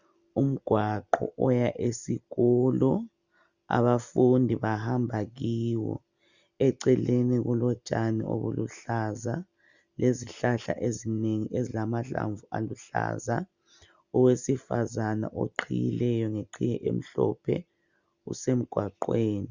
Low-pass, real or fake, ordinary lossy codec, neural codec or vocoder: 7.2 kHz; real; Opus, 64 kbps; none